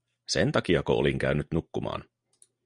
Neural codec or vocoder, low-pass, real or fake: none; 9.9 kHz; real